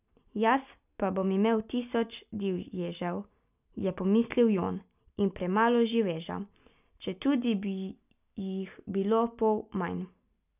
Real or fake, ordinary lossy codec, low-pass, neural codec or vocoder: real; none; 3.6 kHz; none